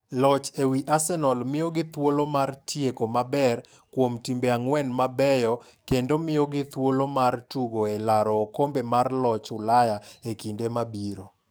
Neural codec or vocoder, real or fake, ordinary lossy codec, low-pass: codec, 44.1 kHz, 7.8 kbps, DAC; fake; none; none